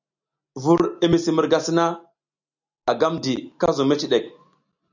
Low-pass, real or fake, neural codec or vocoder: 7.2 kHz; real; none